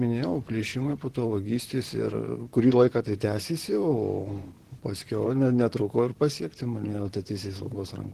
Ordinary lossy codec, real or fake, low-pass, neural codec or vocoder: Opus, 16 kbps; fake; 14.4 kHz; vocoder, 44.1 kHz, 128 mel bands, Pupu-Vocoder